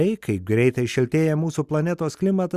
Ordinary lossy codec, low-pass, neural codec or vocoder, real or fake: Opus, 64 kbps; 14.4 kHz; none; real